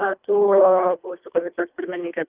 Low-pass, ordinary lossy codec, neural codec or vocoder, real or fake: 3.6 kHz; Opus, 24 kbps; codec, 24 kHz, 1.5 kbps, HILCodec; fake